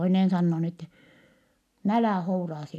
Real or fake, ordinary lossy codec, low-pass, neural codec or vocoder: real; none; 14.4 kHz; none